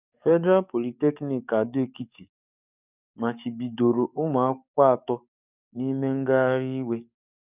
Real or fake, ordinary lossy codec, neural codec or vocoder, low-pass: fake; none; codec, 44.1 kHz, 7.8 kbps, DAC; 3.6 kHz